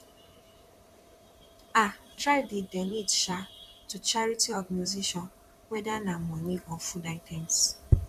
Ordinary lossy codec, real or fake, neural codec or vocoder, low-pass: none; fake; vocoder, 44.1 kHz, 128 mel bands, Pupu-Vocoder; 14.4 kHz